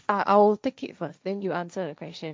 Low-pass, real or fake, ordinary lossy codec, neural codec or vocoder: none; fake; none; codec, 16 kHz, 1.1 kbps, Voila-Tokenizer